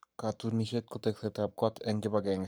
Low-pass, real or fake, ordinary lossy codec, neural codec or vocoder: none; fake; none; codec, 44.1 kHz, 7.8 kbps, Pupu-Codec